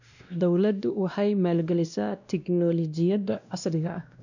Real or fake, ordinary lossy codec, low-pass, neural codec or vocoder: fake; none; 7.2 kHz; codec, 16 kHz, 1 kbps, X-Codec, WavLM features, trained on Multilingual LibriSpeech